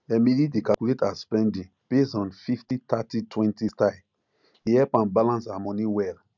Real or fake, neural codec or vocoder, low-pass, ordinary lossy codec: real; none; 7.2 kHz; none